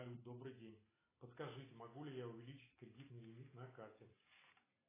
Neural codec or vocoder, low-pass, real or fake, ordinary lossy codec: none; 3.6 kHz; real; AAC, 16 kbps